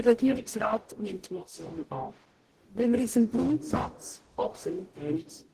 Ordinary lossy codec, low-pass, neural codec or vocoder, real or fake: Opus, 16 kbps; 14.4 kHz; codec, 44.1 kHz, 0.9 kbps, DAC; fake